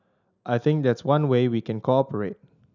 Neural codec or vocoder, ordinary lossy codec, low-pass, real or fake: none; none; 7.2 kHz; real